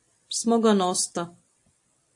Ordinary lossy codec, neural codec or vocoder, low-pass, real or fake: AAC, 64 kbps; none; 10.8 kHz; real